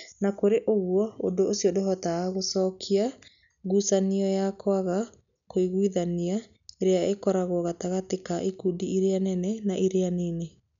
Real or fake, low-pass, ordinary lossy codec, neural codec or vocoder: real; 7.2 kHz; none; none